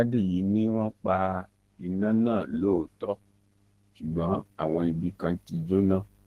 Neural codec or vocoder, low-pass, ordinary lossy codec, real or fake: codec, 32 kHz, 1.9 kbps, SNAC; 14.4 kHz; Opus, 16 kbps; fake